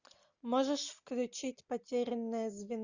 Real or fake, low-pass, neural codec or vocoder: real; 7.2 kHz; none